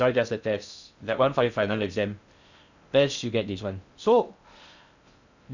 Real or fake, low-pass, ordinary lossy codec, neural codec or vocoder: fake; 7.2 kHz; none; codec, 16 kHz in and 24 kHz out, 0.6 kbps, FocalCodec, streaming, 2048 codes